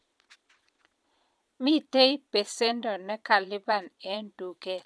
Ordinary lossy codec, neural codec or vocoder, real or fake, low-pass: none; vocoder, 22.05 kHz, 80 mel bands, Vocos; fake; none